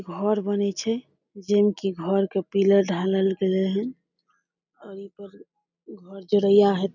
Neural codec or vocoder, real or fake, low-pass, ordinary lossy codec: none; real; 7.2 kHz; none